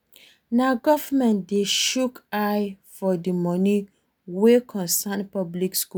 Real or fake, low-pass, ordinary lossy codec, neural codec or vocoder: real; none; none; none